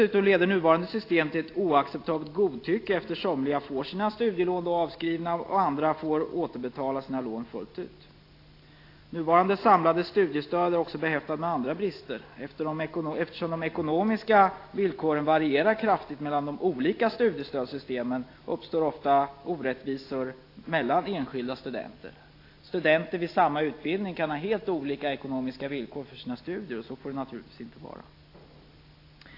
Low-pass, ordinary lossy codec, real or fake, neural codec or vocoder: 5.4 kHz; AAC, 32 kbps; real; none